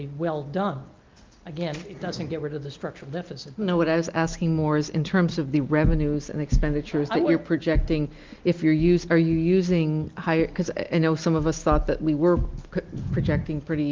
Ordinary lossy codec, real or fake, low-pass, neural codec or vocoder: Opus, 24 kbps; real; 7.2 kHz; none